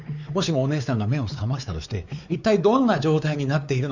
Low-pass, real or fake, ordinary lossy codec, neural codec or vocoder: 7.2 kHz; fake; none; codec, 16 kHz, 4 kbps, X-Codec, WavLM features, trained on Multilingual LibriSpeech